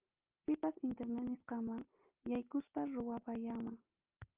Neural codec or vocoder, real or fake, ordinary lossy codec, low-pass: none; real; Opus, 24 kbps; 3.6 kHz